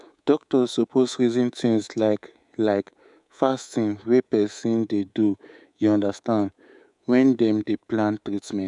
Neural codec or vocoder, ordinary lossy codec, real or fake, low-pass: codec, 24 kHz, 3.1 kbps, DualCodec; none; fake; 10.8 kHz